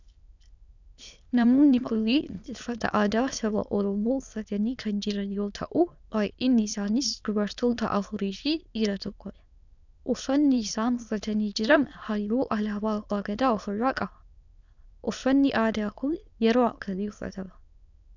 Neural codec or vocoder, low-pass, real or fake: autoencoder, 22.05 kHz, a latent of 192 numbers a frame, VITS, trained on many speakers; 7.2 kHz; fake